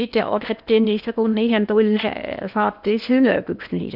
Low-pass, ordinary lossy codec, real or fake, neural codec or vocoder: 5.4 kHz; none; fake; codec, 16 kHz in and 24 kHz out, 0.8 kbps, FocalCodec, streaming, 65536 codes